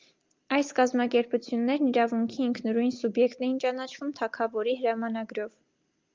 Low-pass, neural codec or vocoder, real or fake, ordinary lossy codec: 7.2 kHz; none; real; Opus, 24 kbps